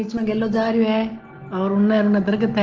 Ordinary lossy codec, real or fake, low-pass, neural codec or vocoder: Opus, 16 kbps; real; 7.2 kHz; none